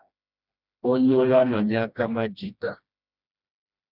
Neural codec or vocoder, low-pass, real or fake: codec, 16 kHz, 1 kbps, FreqCodec, smaller model; 5.4 kHz; fake